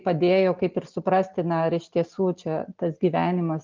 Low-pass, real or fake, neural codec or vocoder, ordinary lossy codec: 7.2 kHz; real; none; Opus, 24 kbps